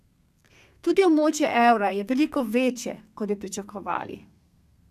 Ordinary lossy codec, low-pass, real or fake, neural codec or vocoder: none; 14.4 kHz; fake; codec, 32 kHz, 1.9 kbps, SNAC